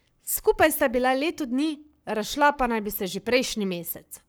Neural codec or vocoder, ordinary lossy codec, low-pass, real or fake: codec, 44.1 kHz, 7.8 kbps, Pupu-Codec; none; none; fake